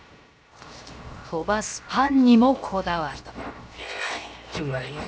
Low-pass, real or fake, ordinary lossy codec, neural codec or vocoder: none; fake; none; codec, 16 kHz, 0.7 kbps, FocalCodec